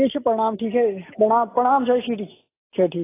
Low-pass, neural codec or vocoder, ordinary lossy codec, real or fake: 3.6 kHz; none; AAC, 16 kbps; real